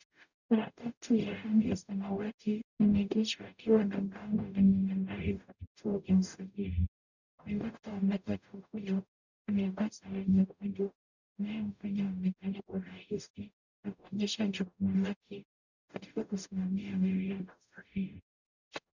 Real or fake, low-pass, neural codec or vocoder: fake; 7.2 kHz; codec, 44.1 kHz, 0.9 kbps, DAC